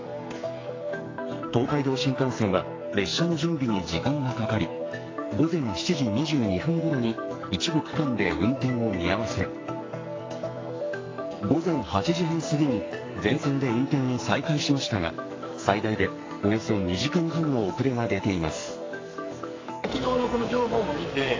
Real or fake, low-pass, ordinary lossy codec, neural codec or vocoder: fake; 7.2 kHz; AAC, 32 kbps; codec, 44.1 kHz, 2.6 kbps, SNAC